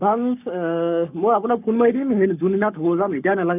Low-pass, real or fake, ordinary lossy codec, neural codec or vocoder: 3.6 kHz; fake; none; codec, 44.1 kHz, 7.8 kbps, Pupu-Codec